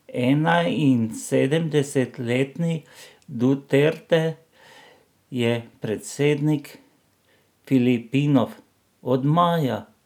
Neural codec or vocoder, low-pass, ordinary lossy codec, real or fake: none; 19.8 kHz; none; real